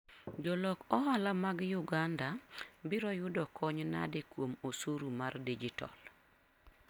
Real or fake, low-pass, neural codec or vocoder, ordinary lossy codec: real; 19.8 kHz; none; none